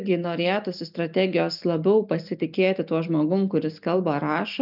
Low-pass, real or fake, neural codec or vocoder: 5.4 kHz; real; none